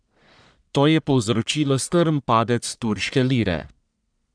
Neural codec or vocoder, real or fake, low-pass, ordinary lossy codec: codec, 44.1 kHz, 1.7 kbps, Pupu-Codec; fake; 9.9 kHz; none